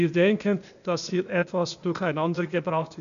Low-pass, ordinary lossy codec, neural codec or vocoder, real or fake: 7.2 kHz; none; codec, 16 kHz, 0.8 kbps, ZipCodec; fake